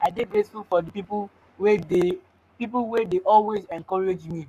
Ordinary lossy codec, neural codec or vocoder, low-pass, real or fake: none; codec, 44.1 kHz, 7.8 kbps, Pupu-Codec; 14.4 kHz; fake